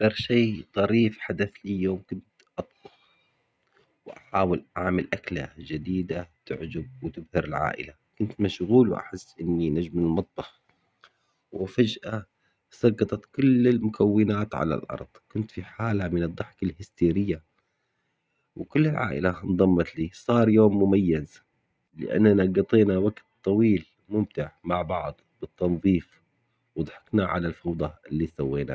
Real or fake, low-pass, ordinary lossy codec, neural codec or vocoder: real; none; none; none